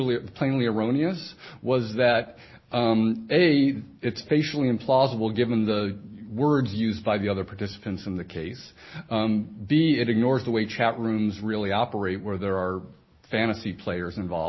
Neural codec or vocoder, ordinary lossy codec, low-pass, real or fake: none; MP3, 24 kbps; 7.2 kHz; real